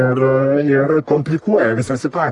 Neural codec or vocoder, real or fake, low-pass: codec, 44.1 kHz, 1.7 kbps, Pupu-Codec; fake; 10.8 kHz